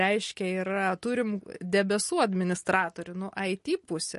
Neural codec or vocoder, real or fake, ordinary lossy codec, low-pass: none; real; MP3, 48 kbps; 14.4 kHz